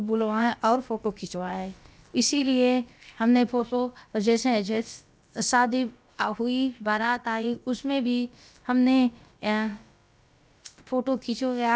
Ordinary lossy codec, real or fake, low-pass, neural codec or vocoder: none; fake; none; codec, 16 kHz, about 1 kbps, DyCAST, with the encoder's durations